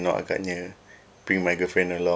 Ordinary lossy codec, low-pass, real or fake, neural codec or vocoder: none; none; real; none